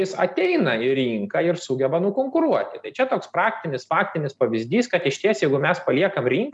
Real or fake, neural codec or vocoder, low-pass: real; none; 10.8 kHz